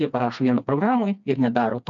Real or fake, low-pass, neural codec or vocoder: fake; 7.2 kHz; codec, 16 kHz, 2 kbps, FreqCodec, smaller model